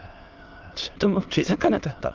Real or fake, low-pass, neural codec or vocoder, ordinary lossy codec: fake; 7.2 kHz; autoencoder, 22.05 kHz, a latent of 192 numbers a frame, VITS, trained on many speakers; Opus, 32 kbps